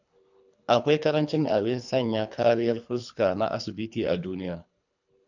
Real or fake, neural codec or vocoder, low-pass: fake; codec, 24 kHz, 3 kbps, HILCodec; 7.2 kHz